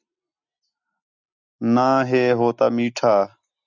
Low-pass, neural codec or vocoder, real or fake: 7.2 kHz; none; real